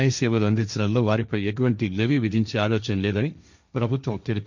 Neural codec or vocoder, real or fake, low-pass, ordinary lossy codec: codec, 16 kHz, 1.1 kbps, Voila-Tokenizer; fake; 7.2 kHz; none